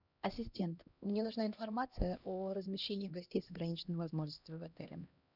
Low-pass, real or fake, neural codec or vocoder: 5.4 kHz; fake; codec, 16 kHz, 1 kbps, X-Codec, HuBERT features, trained on LibriSpeech